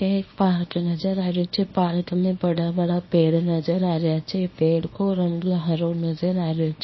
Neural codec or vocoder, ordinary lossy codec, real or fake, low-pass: codec, 24 kHz, 0.9 kbps, WavTokenizer, small release; MP3, 24 kbps; fake; 7.2 kHz